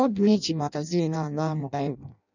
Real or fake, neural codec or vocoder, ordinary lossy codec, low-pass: fake; codec, 16 kHz in and 24 kHz out, 0.6 kbps, FireRedTTS-2 codec; none; 7.2 kHz